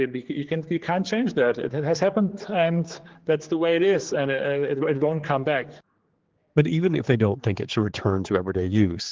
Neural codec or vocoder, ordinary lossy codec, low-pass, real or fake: codec, 16 kHz, 4 kbps, X-Codec, HuBERT features, trained on general audio; Opus, 16 kbps; 7.2 kHz; fake